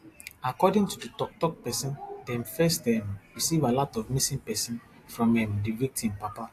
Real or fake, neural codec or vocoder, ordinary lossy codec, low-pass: real; none; AAC, 64 kbps; 14.4 kHz